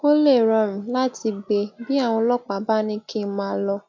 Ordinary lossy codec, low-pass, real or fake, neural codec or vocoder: MP3, 64 kbps; 7.2 kHz; real; none